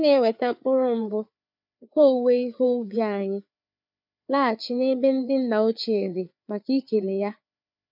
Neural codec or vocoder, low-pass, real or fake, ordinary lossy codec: codec, 16 kHz, 4 kbps, FreqCodec, larger model; 5.4 kHz; fake; none